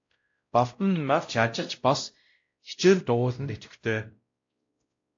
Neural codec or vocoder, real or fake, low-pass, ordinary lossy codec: codec, 16 kHz, 0.5 kbps, X-Codec, WavLM features, trained on Multilingual LibriSpeech; fake; 7.2 kHz; AAC, 48 kbps